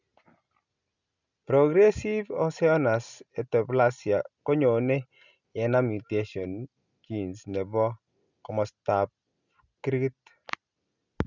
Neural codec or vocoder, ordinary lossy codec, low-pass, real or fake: none; none; 7.2 kHz; real